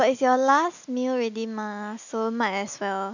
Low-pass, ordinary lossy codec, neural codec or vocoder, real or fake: 7.2 kHz; none; none; real